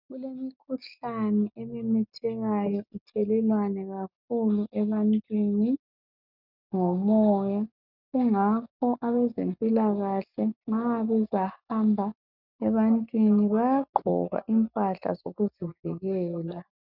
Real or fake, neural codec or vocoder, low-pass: real; none; 5.4 kHz